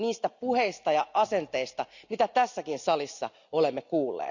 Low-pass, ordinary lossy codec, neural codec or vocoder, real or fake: 7.2 kHz; none; none; real